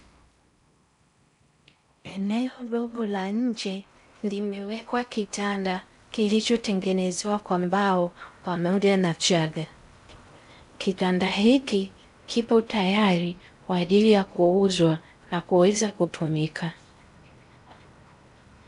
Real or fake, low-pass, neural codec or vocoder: fake; 10.8 kHz; codec, 16 kHz in and 24 kHz out, 0.6 kbps, FocalCodec, streaming, 4096 codes